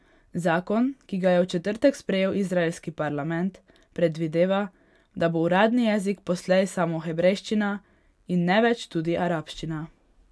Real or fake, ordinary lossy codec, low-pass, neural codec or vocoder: real; none; none; none